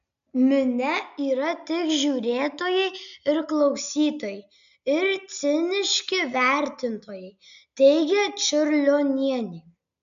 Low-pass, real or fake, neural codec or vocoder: 7.2 kHz; real; none